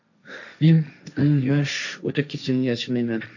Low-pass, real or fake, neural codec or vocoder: 7.2 kHz; fake; codec, 16 kHz, 1.1 kbps, Voila-Tokenizer